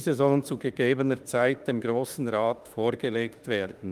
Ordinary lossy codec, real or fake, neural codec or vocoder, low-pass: Opus, 32 kbps; fake; autoencoder, 48 kHz, 32 numbers a frame, DAC-VAE, trained on Japanese speech; 14.4 kHz